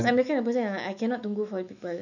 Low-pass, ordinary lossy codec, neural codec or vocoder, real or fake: 7.2 kHz; none; autoencoder, 48 kHz, 128 numbers a frame, DAC-VAE, trained on Japanese speech; fake